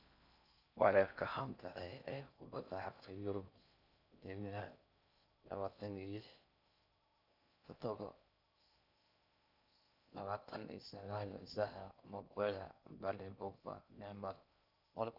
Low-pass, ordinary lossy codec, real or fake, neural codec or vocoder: 5.4 kHz; none; fake; codec, 16 kHz in and 24 kHz out, 0.6 kbps, FocalCodec, streaming, 4096 codes